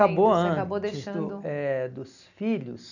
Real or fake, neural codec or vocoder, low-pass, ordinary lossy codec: real; none; 7.2 kHz; none